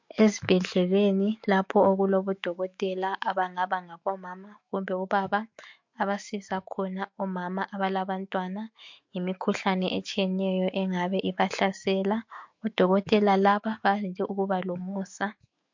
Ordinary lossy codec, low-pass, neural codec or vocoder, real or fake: MP3, 48 kbps; 7.2 kHz; autoencoder, 48 kHz, 128 numbers a frame, DAC-VAE, trained on Japanese speech; fake